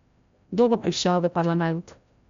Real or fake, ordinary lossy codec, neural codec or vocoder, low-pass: fake; MP3, 64 kbps; codec, 16 kHz, 0.5 kbps, FreqCodec, larger model; 7.2 kHz